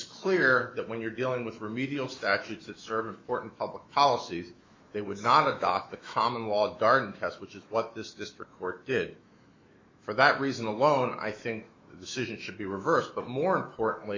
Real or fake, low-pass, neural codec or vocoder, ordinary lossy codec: fake; 7.2 kHz; autoencoder, 48 kHz, 128 numbers a frame, DAC-VAE, trained on Japanese speech; MP3, 48 kbps